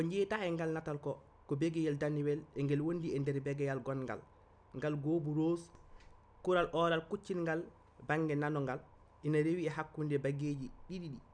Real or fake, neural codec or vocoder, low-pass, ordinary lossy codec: real; none; 9.9 kHz; none